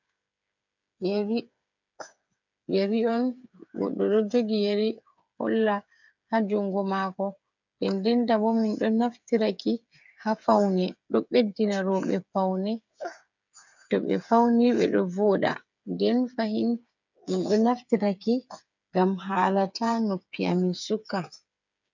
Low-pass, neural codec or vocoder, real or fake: 7.2 kHz; codec, 16 kHz, 8 kbps, FreqCodec, smaller model; fake